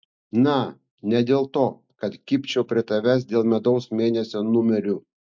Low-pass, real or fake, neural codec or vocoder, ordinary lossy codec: 7.2 kHz; real; none; MP3, 64 kbps